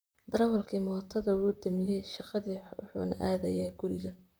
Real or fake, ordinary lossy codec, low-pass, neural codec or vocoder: fake; none; none; vocoder, 44.1 kHz, 128 mel bands, Pupu-Vocoder